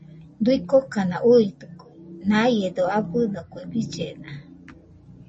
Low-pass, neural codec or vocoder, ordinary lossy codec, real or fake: 10.8 kHz; none; MP3, 32 kbps; real